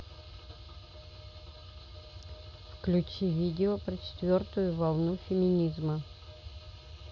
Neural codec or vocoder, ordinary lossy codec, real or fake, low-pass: none; none; real; 7.2 kHz